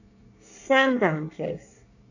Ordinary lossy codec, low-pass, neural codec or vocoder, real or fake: none; 7.2 kHz; codec, 24 kHz, 1 kbps, SNAC; fake